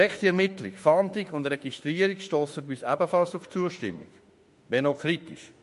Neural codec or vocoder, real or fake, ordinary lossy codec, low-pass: autoencoder, 48 kHz, 32 numbers a frame, DAC-VAE, trained on Japanese speech; fake; MP3, 48 kbps; 14.4 kHz